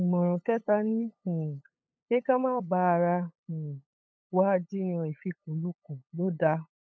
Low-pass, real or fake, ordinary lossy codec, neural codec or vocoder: none; fake; none; codec, 16 kHz, 8 kbps, FunCodec, trained on LibriTTS, 25 frames a second